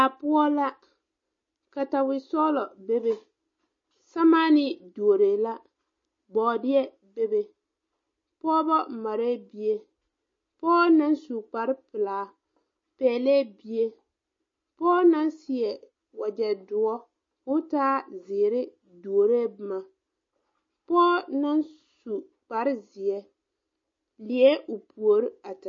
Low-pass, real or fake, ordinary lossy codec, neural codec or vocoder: 7.2 kHz; real; MP3, 32 kbps; none